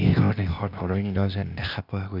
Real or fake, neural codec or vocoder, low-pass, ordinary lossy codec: fake; codec, 16 kHz, 0.8 kbps, ZipCodec; 5.4 kHz; none